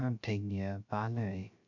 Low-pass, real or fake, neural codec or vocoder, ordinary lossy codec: 7.2 kHz; fake; codec, 16 kHz, 0.3 kbps, FocalCodec; none